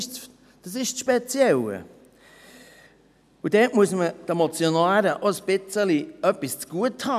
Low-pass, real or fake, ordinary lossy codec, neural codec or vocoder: 14.4 kHz; real; none; none